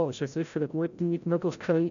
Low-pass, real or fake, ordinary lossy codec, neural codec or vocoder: 7.2 kHz; fake; none; codec, 16 kHz, 0.5 kbps, FreqCodec, larger model